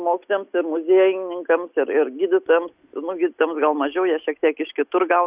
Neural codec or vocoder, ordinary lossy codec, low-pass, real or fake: none; Opus, 64 kbps; 3.6 kHz; real